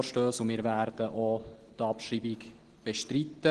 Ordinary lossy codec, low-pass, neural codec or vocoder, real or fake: Opus, 16 kbps; 9.9 kHz; none; real